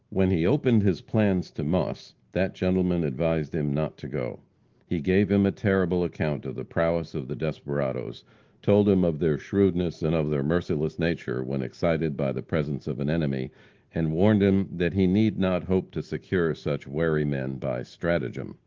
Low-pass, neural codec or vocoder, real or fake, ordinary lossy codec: 7.2 kHz; none; real; Opus, 16 kbps